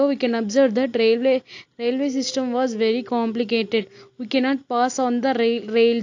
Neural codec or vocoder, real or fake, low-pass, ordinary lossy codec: none; real; 7.2 kHz; AAC, 48 kbps